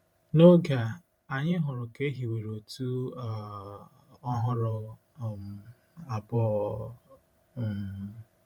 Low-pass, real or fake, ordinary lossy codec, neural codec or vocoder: 19.8 kHz; fake; MP3, 96 kbps; vocoder, 44.1 kHz, 128 mel bands every 512 samples, BigVGAN v2